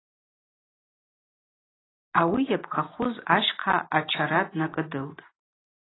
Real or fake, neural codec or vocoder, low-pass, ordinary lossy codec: real; none; 7.2 kHz; AAC, 16 kbps